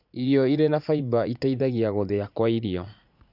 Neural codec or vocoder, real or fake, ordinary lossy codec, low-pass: vocoder, 44.1 kHz, 80 mel bands, Vocos; fake; none; 5.4 kHz